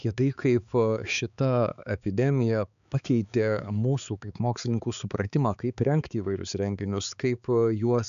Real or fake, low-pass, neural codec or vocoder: fake; 7.2 kHz; codec, 16 kHz, 4 kbps, X-Codec, HuBERT features, trained on balanced general audio